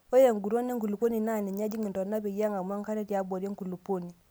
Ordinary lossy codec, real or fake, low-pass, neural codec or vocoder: none; real; none; none